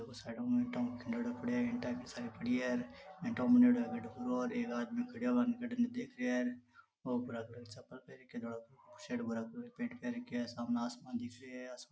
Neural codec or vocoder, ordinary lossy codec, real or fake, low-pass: none; none; real; none